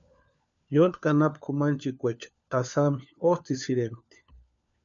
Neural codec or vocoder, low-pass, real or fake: codec, 16 kHz, 4 kbps, FunCodec, trained on LibriTTS, 50 frames a second; 7.2 kHz; fake